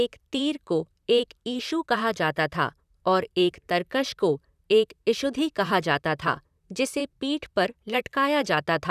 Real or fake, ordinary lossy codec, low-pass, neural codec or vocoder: fake; none; 14.4 kHz; vocoder, 44.1 kHz, 128 mel bands, Pupu-Vocoder